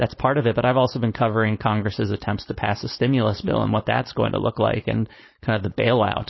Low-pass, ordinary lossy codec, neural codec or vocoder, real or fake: 7.2 kHz; MP3, 24 kbps; codec, 16 kHz, 4.8 kbps, FACodec; fake